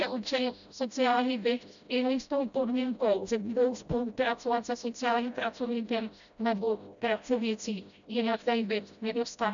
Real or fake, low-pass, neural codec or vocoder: fake; 7.2 kHz; codec, 16 kHz, 0.5 kbps, FreqCodec, smaller model